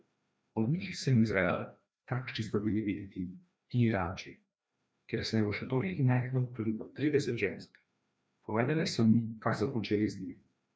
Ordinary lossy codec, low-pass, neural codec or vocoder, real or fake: none; none; codec, 16 kHz, 1 kbps, FreqCodec, larger model; fake